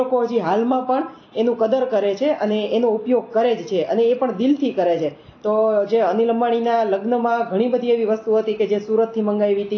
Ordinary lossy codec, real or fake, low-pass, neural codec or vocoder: AAC, 32 kbps; real; 7.2 kHz; none